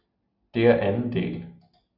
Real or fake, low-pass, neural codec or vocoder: real; 5.4 kHz; none